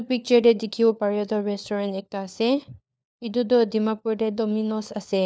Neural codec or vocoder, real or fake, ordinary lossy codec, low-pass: codec, 16 kHz, 4 kbps, FunCodec, trained on LibriTTS, 50 frames a second; fake; none; none